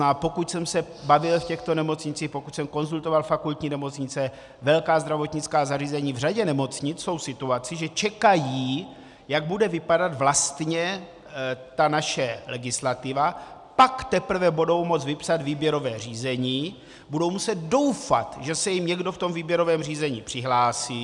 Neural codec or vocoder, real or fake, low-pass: none; real; 10.8 kHz